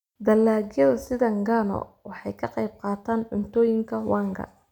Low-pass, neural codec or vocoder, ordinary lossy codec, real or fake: 19.8 kHz; none; none; real